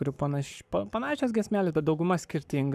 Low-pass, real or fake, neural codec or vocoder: 14.4 kHz; fake; codec, 44.1 kHz, 7.8 kbps, Pupu-Codec